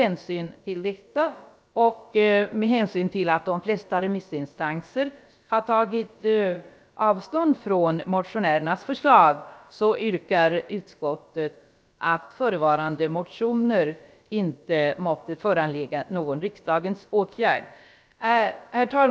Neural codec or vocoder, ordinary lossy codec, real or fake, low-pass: codec, 16 kHz, about 1 kbps, DyCAST, with the encoder's durations; none; fake; none